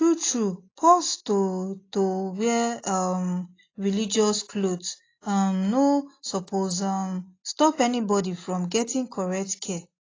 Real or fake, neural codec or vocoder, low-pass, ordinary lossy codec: real; none; 7.2 kHz; AAC, 32 kbps